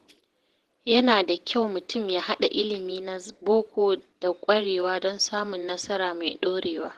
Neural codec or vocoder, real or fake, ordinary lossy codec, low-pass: vocoder, 48 kHz, 128 mel bands, Vocos; fake; Opus, 16 kbps; 14.4 kHz